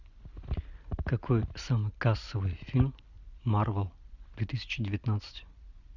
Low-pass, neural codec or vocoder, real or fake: 7.2 kHz; none; real